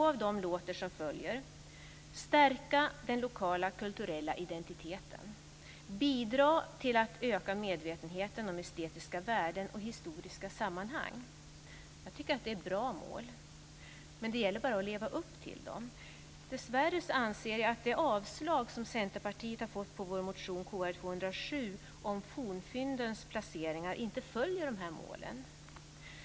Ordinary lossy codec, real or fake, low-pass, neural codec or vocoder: none; real; none; none